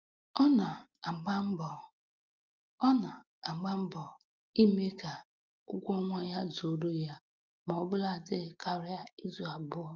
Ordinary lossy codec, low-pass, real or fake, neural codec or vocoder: Opus, 32 kbps; 7.2 kHz; real; none